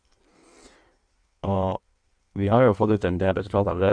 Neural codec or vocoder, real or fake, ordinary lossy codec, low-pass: codec, 16 kHz in and 24 kHz out, 1.1 kbps, FireRedTTS-2 codec; fake; none; 9.9 kHz